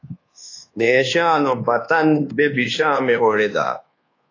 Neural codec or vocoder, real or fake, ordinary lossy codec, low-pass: codec, 24 kHz, 1.2 kbps, DualCodec; fake; AAC, 32 kbps; 7.2 kHz